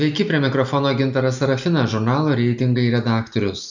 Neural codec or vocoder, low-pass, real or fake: none; 7.2 kHz; real